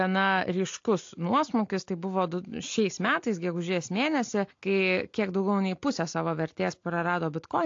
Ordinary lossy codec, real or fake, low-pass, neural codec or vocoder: AAC, 48 kbps; real; 7.2 kHz; none